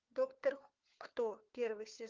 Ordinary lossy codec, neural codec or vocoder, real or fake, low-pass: Opus, 32 kbps; codec, 16 kHz, 4 kbps, FunCodec, trained on LibriTTS, 50 frames a second; fake; 7.2 kHz